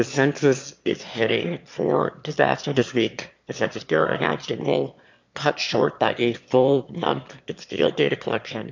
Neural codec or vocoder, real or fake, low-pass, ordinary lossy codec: autoencoder, 22.05 kHz, a latent of 192 numbers a frame, VITS, trained on one speaker; fake; 7.2 kHz; MP3, 64 kbps